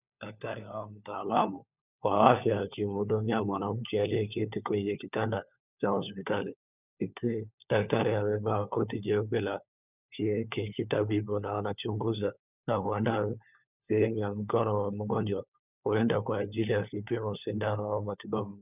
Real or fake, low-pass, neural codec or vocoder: fake; 3.6 kHz; codec, 16 kHz, 4 kbps, FunCodec, trained on LibriTTS, 50 frames a second